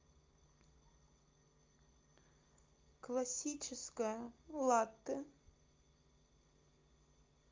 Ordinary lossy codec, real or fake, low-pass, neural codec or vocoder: Opus, 24 kbps; real; 7.2 kHz; none